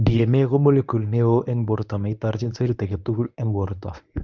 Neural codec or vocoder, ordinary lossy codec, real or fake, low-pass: codec, 24 kHz, 0.9 kbps, WavTokenizer, medium speech release version 1; none; fake; 7.2 kHz